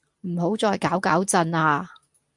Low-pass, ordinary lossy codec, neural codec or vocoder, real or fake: 10.8 kHz; MP3, 64 kbps; none; real